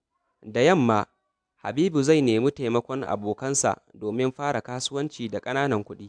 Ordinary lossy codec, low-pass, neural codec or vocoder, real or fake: MP3, 96 kbps; 9.9 kHz; none; real